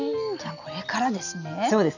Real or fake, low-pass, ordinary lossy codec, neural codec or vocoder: real; 7.2 kHz; none; none